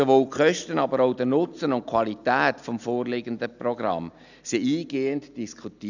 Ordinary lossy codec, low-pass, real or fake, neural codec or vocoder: none; 7.2 kHz; real; none